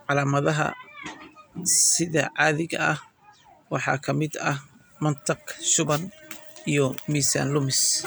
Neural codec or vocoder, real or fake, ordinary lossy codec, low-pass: none; real; none; none